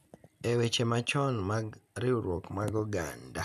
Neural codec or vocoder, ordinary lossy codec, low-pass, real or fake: none; none; 14.4 kHz; real